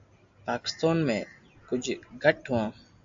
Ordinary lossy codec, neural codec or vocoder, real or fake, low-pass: AAC, 64 kbps; none; real; 7.2 kHz